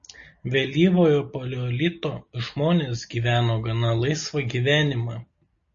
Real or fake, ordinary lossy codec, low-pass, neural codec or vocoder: real; MP3, 32 kbps; 7.2 kHz; none